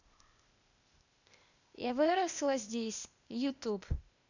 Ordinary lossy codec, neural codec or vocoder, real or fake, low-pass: none; codec, 16 kHz, 0.8 kbps, ZipCodec; fake; 7.2 kHz